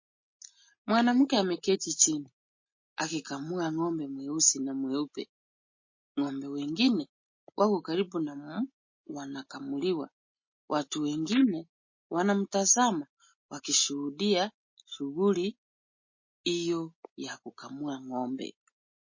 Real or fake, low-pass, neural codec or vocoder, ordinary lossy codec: real; 7.2 kHz; none; MP3, 32 kbps